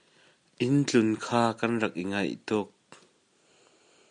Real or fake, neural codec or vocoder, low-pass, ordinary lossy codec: real; none; 9.9 kHz; MP3, 96 kbps